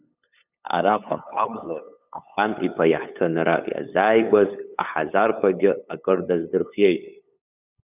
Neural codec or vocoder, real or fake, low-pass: codec, 16 kHz, 8 kbps, FunCodec, trained on LibriTTS, 25 frames a second; fake; 3.6 kHz